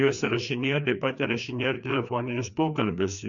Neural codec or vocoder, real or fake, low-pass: codec, 16 kHz, 2 kbps, FreqCodec, larger model; fake; 7.2 kHz